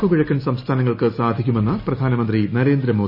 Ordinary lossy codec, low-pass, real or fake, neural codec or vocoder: none; 5.4 kHz; real; none